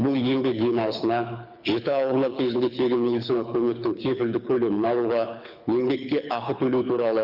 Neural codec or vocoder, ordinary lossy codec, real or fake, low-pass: codec, 24 kHz, 6 kbps, HILCodec; Opus, 64 kbps; fake; 5.4 kHz